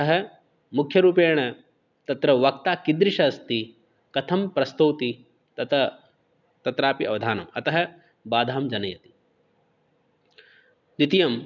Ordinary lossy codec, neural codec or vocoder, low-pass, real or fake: none; none; 7.2 kHz; real